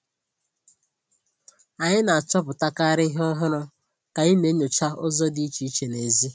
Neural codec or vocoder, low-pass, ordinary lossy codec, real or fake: none; none; none; real